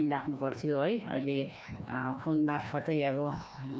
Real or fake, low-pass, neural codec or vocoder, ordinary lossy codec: fake; none; codec, 16 kHz, 1 kbps, FreqCodec, larger model; none